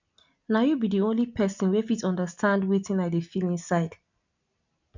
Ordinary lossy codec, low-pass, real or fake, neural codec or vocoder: none; 7.2 kHz; real; none